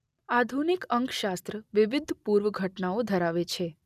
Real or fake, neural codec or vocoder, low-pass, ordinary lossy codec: real; none; 14.4 kHz; none